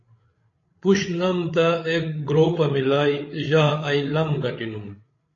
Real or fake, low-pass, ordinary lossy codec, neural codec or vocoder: fake; 7.2 kHz; AAC, 32 kbps; codec, 16 kHz, 8 kbps, FreqCodec, larger model